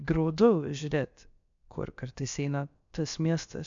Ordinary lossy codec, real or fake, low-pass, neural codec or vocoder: MP3, 64 kbps; fake; 7.2 kHz; codec, 16 kHz, about 1 kbps, DyCAST, with the encoder's durations